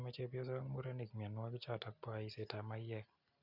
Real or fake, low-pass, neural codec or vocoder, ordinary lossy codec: real; 5.4 kHz; none; Opus, 64 kbps